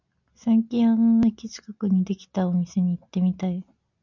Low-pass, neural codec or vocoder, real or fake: 7.2 kHz; none; real